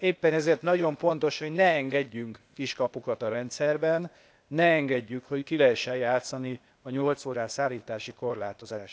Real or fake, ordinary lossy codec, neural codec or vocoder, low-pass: fake; none; codec, 16 kHz, 0.8 kbps, ZipCodec; none